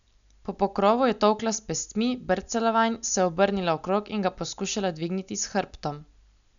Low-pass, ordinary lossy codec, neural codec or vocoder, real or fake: 7.2 kHz; none; none; real